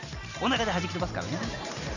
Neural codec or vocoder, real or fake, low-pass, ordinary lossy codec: none; real; 7.2 kHz; none